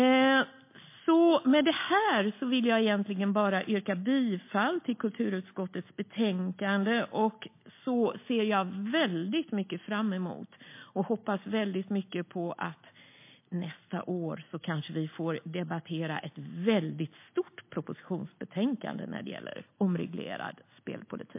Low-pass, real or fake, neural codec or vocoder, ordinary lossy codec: 3.6 kHz; real; none; MP3, 24 kbps